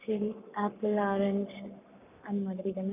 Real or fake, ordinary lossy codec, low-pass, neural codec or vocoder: fake; none; 3.6 kHz; codec, 44.1 kHz, 7.8 kbps, Pupu-Codec